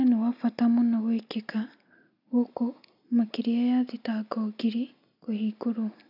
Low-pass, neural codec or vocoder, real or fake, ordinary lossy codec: 5.4 kHz; none; real; none